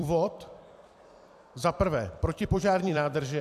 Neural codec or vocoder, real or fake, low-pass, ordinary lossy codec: vocoder, 44.1 kHz, 128 mel bands every 256 samples, BigVGAN v2; fake; 14.4 kHz; AAC, 96 kbps